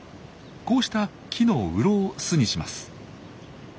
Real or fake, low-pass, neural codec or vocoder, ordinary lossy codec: real; none; none; none